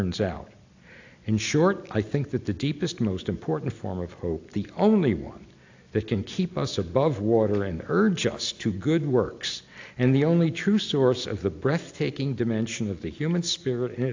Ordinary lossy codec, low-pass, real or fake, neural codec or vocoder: AAC, 48 kbps; 7.2 kHz; real; none